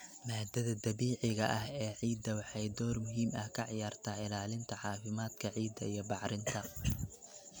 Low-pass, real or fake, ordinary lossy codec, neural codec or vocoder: none; real; none; none